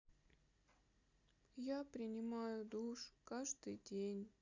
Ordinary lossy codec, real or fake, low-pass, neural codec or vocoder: Opus, 64 kbps; real; 7.2 kHz; none